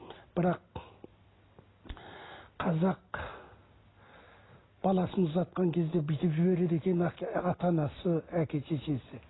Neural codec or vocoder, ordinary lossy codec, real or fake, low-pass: none; AAC, 16 kbps; real; 7.2 kHz